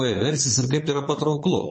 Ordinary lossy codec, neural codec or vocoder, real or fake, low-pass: MP3, 32 kbps; vocoder, 22.05 kHz, 80 mel bands, Vocos; fake; 9.9 kHz